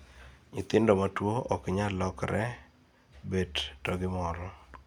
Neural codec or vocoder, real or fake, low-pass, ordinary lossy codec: none; real; 19.8 kHz; none